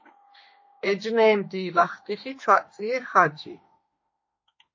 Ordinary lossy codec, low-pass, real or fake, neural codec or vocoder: MP3, 32 kbps; 7.2 kHz; fake; autoencoder, 48 kHz, 32 numbers a frame, DAC-VAE, trained on Japanese speech